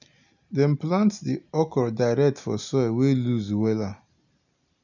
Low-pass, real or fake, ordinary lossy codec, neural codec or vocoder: 7.2 kHz; real; none; none